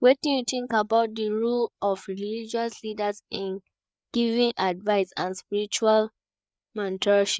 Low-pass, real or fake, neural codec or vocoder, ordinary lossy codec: none; fake; codec, 16 kHz, 4 kbps, FreqCodec, larger model; none